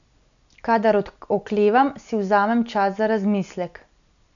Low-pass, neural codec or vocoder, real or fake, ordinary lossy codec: 7.2 kHz; none; real; none